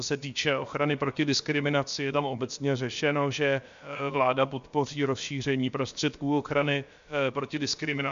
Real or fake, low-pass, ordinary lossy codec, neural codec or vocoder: fake; 7.2 kHz; MP3, 64 kbps; codec, 16 kHz, about 1 kbps, DyCAST, with the encoder's durations